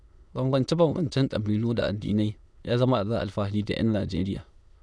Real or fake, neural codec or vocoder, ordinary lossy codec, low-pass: fake; autoencoder, 22.05 kHz, a latent of 192 numbers a frame, VITS, trained on many speakers; none; none